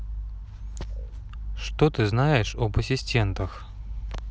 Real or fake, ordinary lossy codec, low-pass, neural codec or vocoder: real; none; none; none